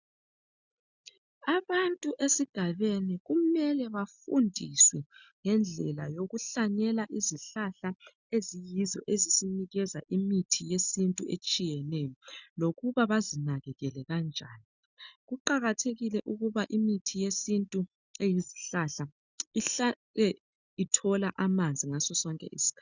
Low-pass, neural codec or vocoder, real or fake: 7.2 kHz; none; real